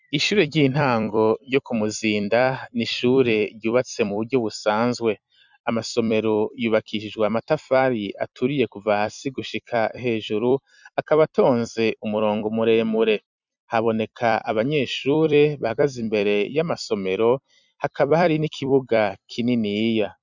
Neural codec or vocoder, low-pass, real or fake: vocoder, 44.1 kHz, 128 mel bands every 256 samples, BigVGAN v2; 7.2 kHz; fake